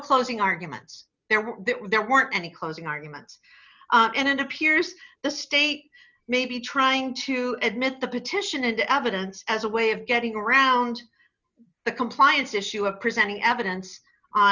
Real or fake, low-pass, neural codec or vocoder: real; 7.2 kHz; none